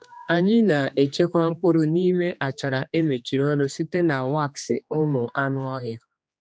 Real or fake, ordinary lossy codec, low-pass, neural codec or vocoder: fake; none; none; codec, 16 kHz, 2 kbps, X-Codec, HuBERT features, trained on general audio